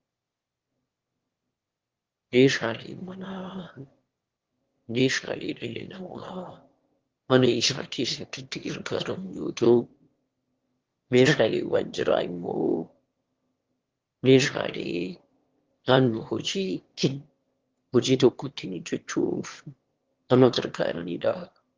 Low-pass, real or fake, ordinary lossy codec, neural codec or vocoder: 7.2 kHz; fake; Opus, 16 kbps; autoencoder, 22.05 kHz, a latent of 192 numbers a frame, VITS, trained on one speaker